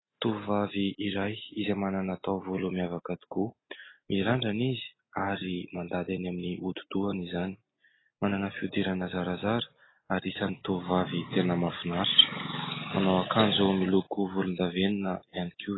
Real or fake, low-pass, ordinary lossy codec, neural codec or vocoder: real; 7.2 kHz; AAC, 16 kbps; none